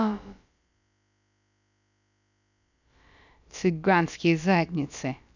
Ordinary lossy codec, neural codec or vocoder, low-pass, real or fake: none; codec, 16 kHz, about 1 kbps, DyCAST, with the encoder's durations; 7.2 kHz; fake